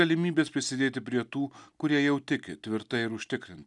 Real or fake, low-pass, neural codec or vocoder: real; 10.8 kHz; none